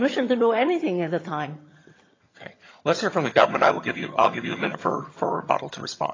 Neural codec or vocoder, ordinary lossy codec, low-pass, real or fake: vocoder, 22.05 kHz, 80 mel bands, HiFi-GAN; AAC, 32 kbps; 7.2 kHz; fake